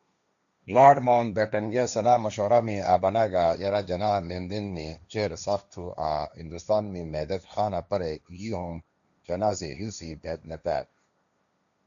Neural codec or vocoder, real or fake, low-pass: codec, 16 kHz, 1.1 kbps, Voila-Tokenizer; fake; 7.2 kHz